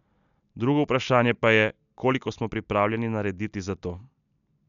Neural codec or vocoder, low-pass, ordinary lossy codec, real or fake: none; 7.2 kHz; none; real